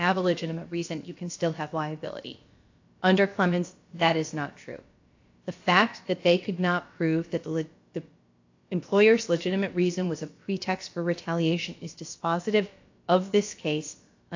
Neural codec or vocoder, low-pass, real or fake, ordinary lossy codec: codec, 16 kHz, about 1 kbps, DyCAST, with the encoder's durations; 7.2 kHz; fake; AAC, 48 kbps